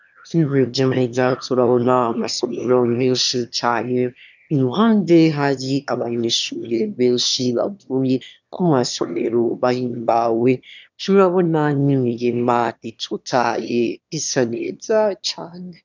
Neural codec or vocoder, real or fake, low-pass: autoencoder, 22.05 kHz, a latent of 192 numbers a frame, VITS, trained on one speaker; fake; 7.2 kHz